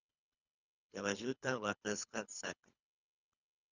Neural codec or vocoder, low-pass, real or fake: codec, 24 kHz, 3 kbps, HILCodec; 7.2 kHz; fake